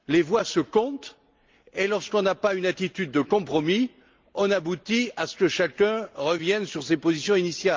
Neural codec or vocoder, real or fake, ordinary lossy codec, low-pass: none; real; Opus, 24 kbps; 7.2 kHz